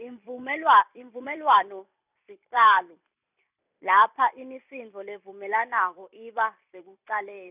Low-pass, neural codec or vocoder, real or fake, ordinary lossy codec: 3.6 kHz; vocoder, 44.1 kHz, 128 mel bands every 256 samples, BigVGAN v2; fake; none